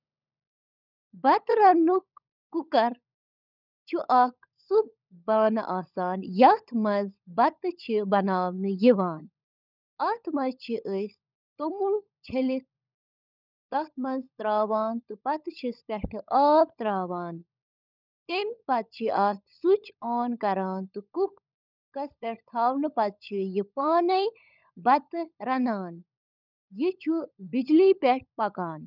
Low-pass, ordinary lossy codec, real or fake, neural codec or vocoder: 5.4 kHz; none; fake; codec, 16 kHz, 16 kbps, FunCodec, trained on LibriTTS, 50 frames a second